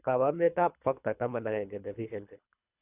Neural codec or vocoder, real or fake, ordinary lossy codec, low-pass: codec, 24 kHz, 3 kbps, HILCodec; fake; none; 3.6 kHz